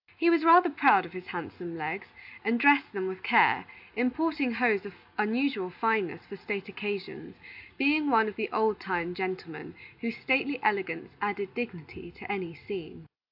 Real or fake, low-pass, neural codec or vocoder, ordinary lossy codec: real; 5.4 kHz; none; AAC, 48 kbps